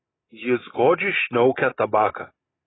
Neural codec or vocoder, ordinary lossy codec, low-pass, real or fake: none; AAC, 16 kbps; 7.2 kHz; real